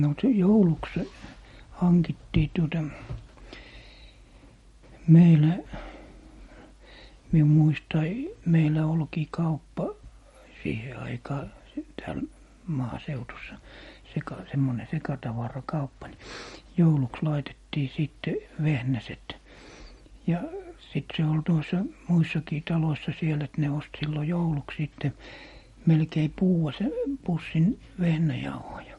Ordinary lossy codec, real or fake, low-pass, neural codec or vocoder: MP3, 48 kbps; real; 19.8 kHz; none